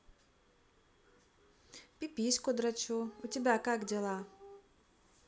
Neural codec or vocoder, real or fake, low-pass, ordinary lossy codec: none; real; none; none